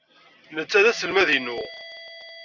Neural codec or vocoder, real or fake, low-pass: none; real; 7.2 kHz